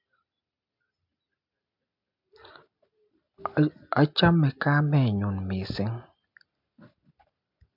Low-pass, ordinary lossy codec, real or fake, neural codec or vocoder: 5.4 kHz; MP3, 48 kbps; real; none